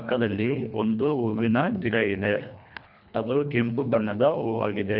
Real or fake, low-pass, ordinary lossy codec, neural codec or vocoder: fake; 5.4 kHz; none; codec, 24 kHz, 1.5 kbps, HILCodec